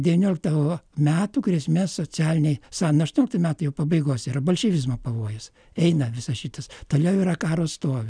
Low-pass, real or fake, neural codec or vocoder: 9.9 kHz; real; none